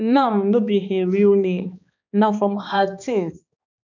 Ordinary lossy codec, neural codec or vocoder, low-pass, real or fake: none; codec, 16 kHz, 2 kbps, X-Codec, HuBERT features, trained on balanced general audio; 7.2 kHz; fake